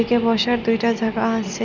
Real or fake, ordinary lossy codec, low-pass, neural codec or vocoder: real; none; 7.2 kHz; none